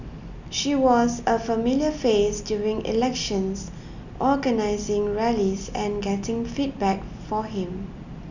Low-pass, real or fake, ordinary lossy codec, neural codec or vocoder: 7.2 kHz; real; none; none